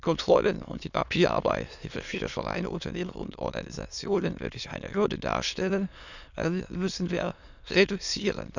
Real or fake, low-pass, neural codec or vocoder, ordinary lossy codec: fake; 7.2 kHz; autoencoder, 22.05 kHz, a latent of 192 numbers a frame, VITS, trained on many speakers; none